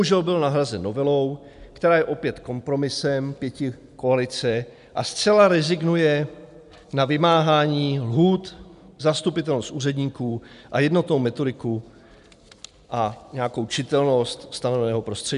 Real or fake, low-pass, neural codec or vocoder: real; 10.8 kHz; none